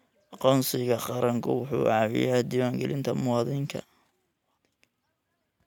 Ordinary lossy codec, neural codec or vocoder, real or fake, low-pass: none; none; real; 19.8 kHz